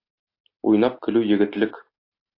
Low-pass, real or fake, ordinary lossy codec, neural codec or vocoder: 5.4 kHz; real; AAC, 32 kbps; none